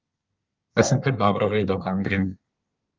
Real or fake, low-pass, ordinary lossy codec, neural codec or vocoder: fake; 7.2 kHz; Opus, 24 kbps; codec, 24 kHz, 1 kbps, SNAC